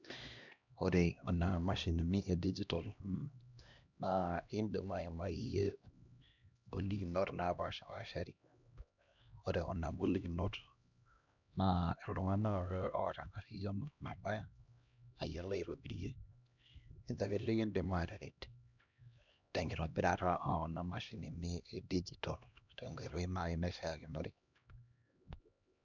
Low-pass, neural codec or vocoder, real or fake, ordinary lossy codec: 7.2 kHz; codec, 16 kHz, 1 kbps, X-Codec, HuBERT features, trained on LibriSpeech; fake; none